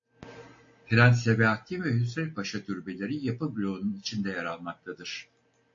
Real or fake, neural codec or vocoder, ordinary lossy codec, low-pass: real; none; AAC, 48 kbps; 7.2 kHz